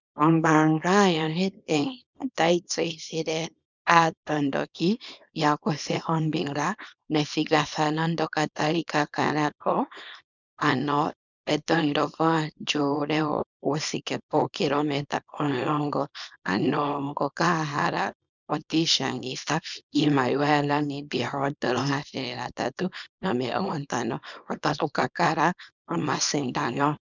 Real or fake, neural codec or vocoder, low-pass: fake; codec, 24 kHz, 0.9 kbps, WavTokenizer, small release; 7.2 kHz